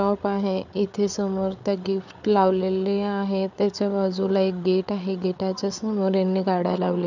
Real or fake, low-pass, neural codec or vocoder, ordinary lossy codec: fake; 7.2 kHz; codec, 16 kHz, 8 kbps, FreqCodec, larger model; none